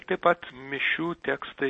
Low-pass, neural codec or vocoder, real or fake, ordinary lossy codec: 10.8 kHz; none; real; MP3, 32 kbps